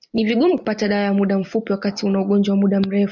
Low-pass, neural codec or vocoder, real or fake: 7.2 kHz; none; real